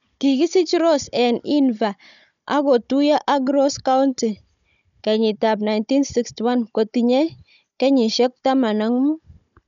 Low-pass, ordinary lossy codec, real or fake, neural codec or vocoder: 7.2 kHz; none; fake; codec, 16 kHz, 16 kbps, FunCodec, trained on Chinese and English, 50 frames a second